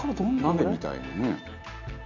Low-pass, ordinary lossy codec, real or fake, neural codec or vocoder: 7.2 kHz; none; real; none